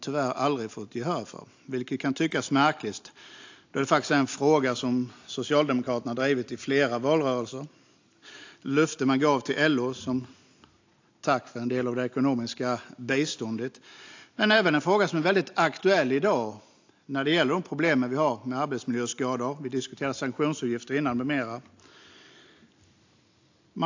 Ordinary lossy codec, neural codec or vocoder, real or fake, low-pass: AAC, 48 kbps; none; real; 7.2 kHz